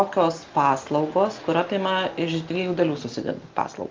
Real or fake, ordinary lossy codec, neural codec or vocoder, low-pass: real; Opus, 32 kbps; none; 7.2 kHz